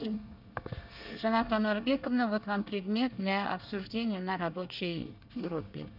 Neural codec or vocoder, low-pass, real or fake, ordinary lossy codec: codec, 24 kHz, 1 kbps, SNAC; 5.4 kHz; fake; none